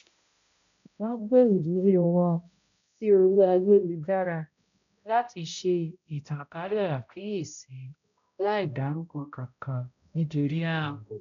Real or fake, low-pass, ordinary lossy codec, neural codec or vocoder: fake; 7.2 kHz; none; codec, 16 kHz, 0.5 kbps, X-Codec, HuBERT features, trained on balanced general audio